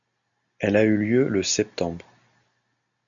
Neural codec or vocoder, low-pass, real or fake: none; 7.2 kHz; real